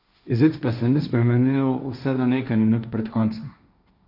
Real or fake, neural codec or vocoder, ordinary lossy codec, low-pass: fake; codec, 16 kHz, 1.1 kbps, Voila-Tokenizer; none; 5.4 kHz